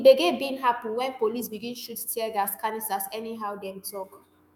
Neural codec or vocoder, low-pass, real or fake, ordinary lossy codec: autoencoder, 48 kHz, 128 numbers a frame, DAC-VAE, trained on Japanese speech; none; fake; none